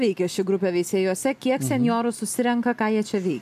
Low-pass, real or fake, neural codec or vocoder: 14.4 kHz; real; none